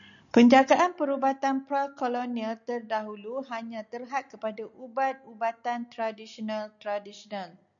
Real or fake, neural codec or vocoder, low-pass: real; none; 7.2 kHz